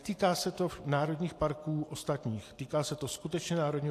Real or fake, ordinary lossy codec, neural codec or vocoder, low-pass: real; AAC, 64 kbps; none; 14.4 kHz